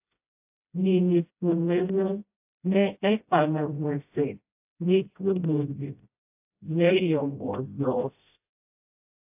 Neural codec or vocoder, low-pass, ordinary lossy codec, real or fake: codec, 16 kHz, 0.5 kbps, FreqCodec, smaller model; 3.6 kHz; AAC, 32 kbps; fake